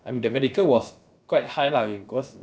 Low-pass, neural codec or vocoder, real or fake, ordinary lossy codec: none; codec, 16 kHz, about 1 kbps, DyCAST, with the encoder's durations; fake; none